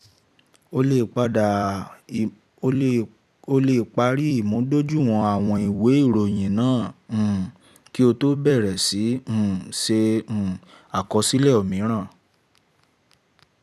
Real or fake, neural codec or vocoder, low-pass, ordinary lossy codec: fake; vocoder, 44.1 kHz, 128 mel bands every 256 samples, BigVGAN v2; 14.4 kHz; none